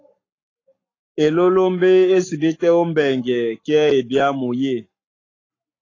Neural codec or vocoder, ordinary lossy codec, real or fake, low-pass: codec, 44.1 kHz, 7.8 kbps, Pupu-Codec; AAC, 32 kbps; fake; 7.2 kHz